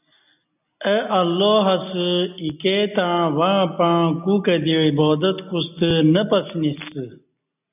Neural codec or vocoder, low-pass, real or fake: none; 3.6 kHz; real